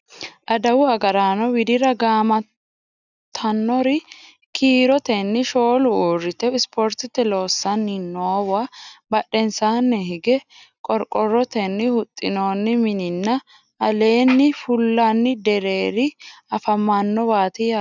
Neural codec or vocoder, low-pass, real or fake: none; 7.2 kHz; real